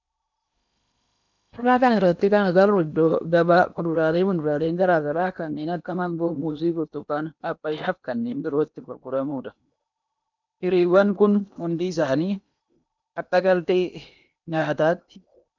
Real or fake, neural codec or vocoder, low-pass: fake; codec, 16 kHz in and 24 kHz out, 0.8 kbps, FocalCodec, streaming, 65536 codes; 7.2 kHz